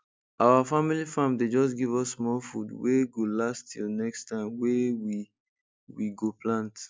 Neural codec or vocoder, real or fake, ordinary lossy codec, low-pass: none; real; none; none